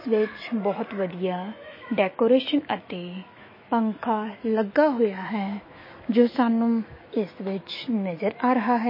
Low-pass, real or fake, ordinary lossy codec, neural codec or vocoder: 5.4 kHz; fake; MP3, 24 kbps; autoencoder, 48 kHz, 128 numbers a frame, DAC-VAE, trained on Japanese speech